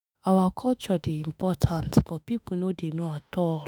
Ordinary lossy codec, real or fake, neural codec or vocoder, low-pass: none; fake; autoencoder, 48 kHz, 32 numbers a frame, DAC-VAE, trained on Japanese speech; none